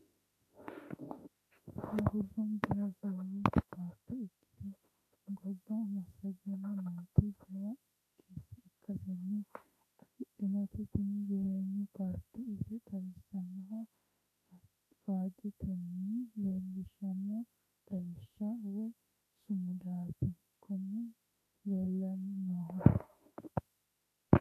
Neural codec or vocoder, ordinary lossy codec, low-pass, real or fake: autoencoder, 48 kHz, 32 numbers a frame, DAC-VAE, trained on Japanese speech; AAC, 64 kbps; 14.4 kHz; fake